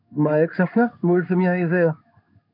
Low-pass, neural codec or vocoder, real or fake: 5.4 kHz; codec, 16 kHz in and 24 kHz out, 1 kbps, XY-Tokenizer; fake